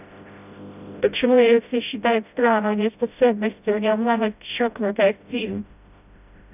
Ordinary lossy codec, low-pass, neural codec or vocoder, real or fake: none; 3.6 kHz; codec, 16 kHz, 0.5 kbps, FreqCodec, smaller model; fake